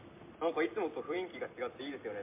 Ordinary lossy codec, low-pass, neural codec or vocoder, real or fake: MP3, 24 kbps; 3.6 kHz; vocoder, 44.1 kHz, 128 mel bands every 256 samples, BigVGAN v2; fake